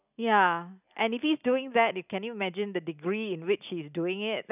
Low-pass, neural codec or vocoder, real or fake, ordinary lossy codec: 3.6 kHz; none; real; none